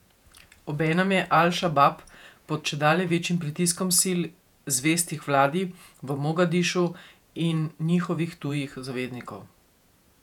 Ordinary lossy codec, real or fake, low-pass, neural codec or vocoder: none; fake; 19.8 kHz; vocoder, 48 kHz, 128 mel bands, Vocos